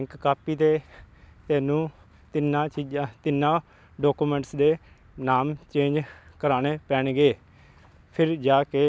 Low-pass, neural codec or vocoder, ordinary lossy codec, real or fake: none; none; none; real